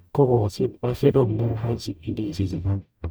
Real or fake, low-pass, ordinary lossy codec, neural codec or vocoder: fake; none; none; codec, 44.1 kHz, 0.9 kbps, DAC